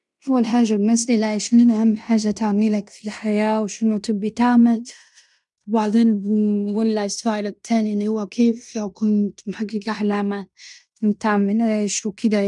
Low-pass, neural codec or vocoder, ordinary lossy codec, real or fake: 10.8 kHz; codec, 16 kHz in and 24 kHz out, 0.9 kbps, LongCat-Audio-Codec, fine tuned four codebook decoder; none; fake